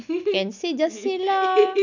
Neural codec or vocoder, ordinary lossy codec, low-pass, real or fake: none; none; 7.2 kHz; real